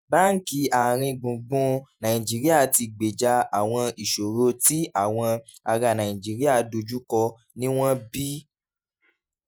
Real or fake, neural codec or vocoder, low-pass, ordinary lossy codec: fake; vocoder, 48 kHz, 128 mel bands, Vocos; none; none